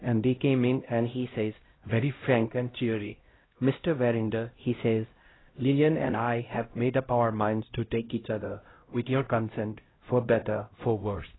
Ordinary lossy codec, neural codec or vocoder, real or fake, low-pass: AAC, 16 kbps; codec, 16 kHz, 0.5 kbps, X-Codec, HuBERT features, trained on LibriSpeech; fake; 7.2 kHz